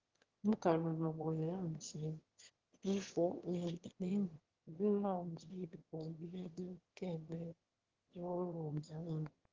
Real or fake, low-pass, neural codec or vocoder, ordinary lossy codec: fake; 7.2 kHz; autoencoder, 22.05 kHz, a latent of 192 numbers a frame, VITS, trained on one speaker; Opus, 16 kbps